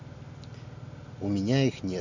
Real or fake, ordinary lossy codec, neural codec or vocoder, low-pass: real; none; none; 7.2 kHz